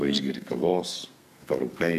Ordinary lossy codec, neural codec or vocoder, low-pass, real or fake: AAC, 96 kbps; codec, 32 kHz, 1.9 kbps, SNAC; 14.4 kHz; fake